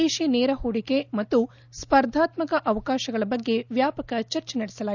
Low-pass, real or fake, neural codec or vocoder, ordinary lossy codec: 7.2 kHz; real; none; none